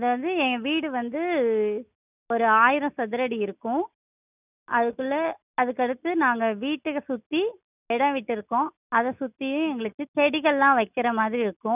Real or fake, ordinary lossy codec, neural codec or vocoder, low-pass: real; none; none; 3.6 kHz